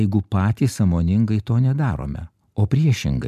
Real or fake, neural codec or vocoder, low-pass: real; none; 14.4 kHz